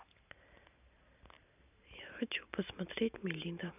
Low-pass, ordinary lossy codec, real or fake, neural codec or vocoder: 3.6 kHz; none; real; none